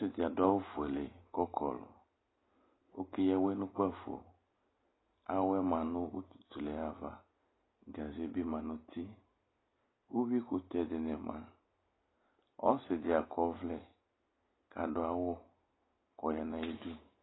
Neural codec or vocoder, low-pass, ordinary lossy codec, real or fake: none; 7.2 kHz; AAC, 16 kbps; real